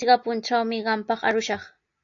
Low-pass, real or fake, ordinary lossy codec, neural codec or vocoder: 7.2 kHz; real; AAC, 64 kbps; none